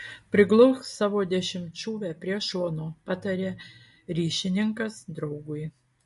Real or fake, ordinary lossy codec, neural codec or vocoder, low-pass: real; MP3, 48 kbps; none; 14.4 kHz